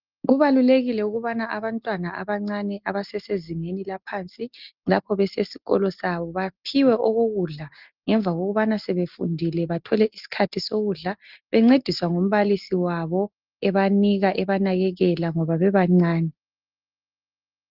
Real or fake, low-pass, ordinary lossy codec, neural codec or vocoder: real; 5.4 kHz; Opus, 32 kbps; none